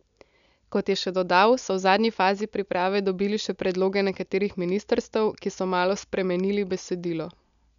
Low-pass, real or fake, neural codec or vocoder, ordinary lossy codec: 7.2 kHz; real; none; none